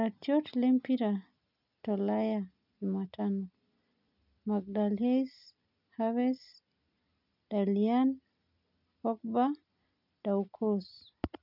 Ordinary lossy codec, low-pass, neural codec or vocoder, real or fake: none; 5.4 kHz; none; real